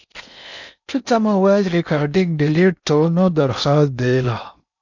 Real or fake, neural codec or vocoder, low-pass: fake; codec, 16 kHz in and 24 kHz out, 0.6 kbps, FocalCodec, streaming, 4096 codes; 7.2 kHz